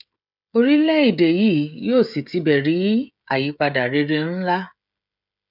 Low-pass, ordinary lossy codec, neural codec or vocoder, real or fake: 5.4 kHz; none; codec, 16 kHz, 16 kbps, FreqCodec, smaller model; fake